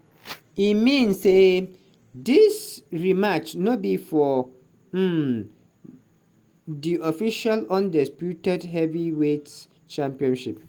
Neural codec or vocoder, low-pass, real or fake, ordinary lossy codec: none; 19.8 kHz; real; Opus, 24 kbps